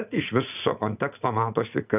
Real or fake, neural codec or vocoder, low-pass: fake; vocoder, 44.1 kHz, 80 mel bands, Vocos; 3.6 kHz